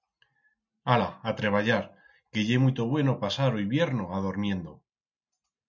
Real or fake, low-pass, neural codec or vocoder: real; 7.2 kHz; none